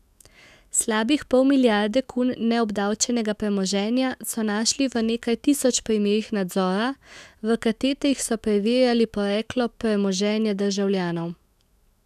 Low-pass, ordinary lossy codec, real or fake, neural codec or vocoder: 14.4 kHz; none; fake; autoencoder, 48 kHz, 128 numbers a frame, DAC-VAE, trained on Japanese speech